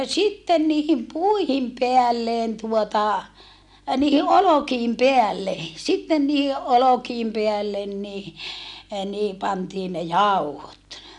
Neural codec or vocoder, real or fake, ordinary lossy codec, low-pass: none; real; none; 10.8 kHz